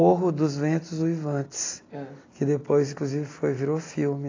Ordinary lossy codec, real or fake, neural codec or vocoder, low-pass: AAC, 32 kbps; real; none; 7.2 kHz